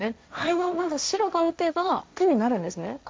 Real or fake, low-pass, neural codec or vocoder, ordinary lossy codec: fake; none; codec, 16 kHz, 1.1 kbps, Voila-Tokenizer; none